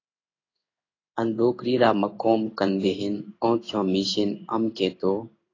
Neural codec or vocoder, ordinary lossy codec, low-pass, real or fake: codec, 16 kHz in and 24 kHz out, 1 kbps, XY-Tokenizer; AAC, 32 kbps; 7.2 kHz; fake